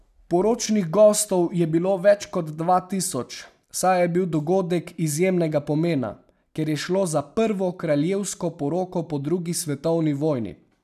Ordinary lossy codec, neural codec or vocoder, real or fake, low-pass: none; none; real; 14.4 kHz